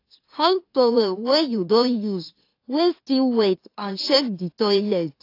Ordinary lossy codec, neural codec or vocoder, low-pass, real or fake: AAC, 32 kbps; autoencoder, 44.1 kHz, a latent of 192 numbers a frame, MeloTTS; 5.4 kHz; fake